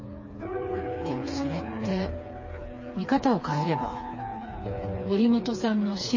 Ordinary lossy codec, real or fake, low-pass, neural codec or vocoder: MP3, 32 kbps; fake; 7.2 kHz; codec, 16 kHz, 4 kbps, FreqCodec, smaller model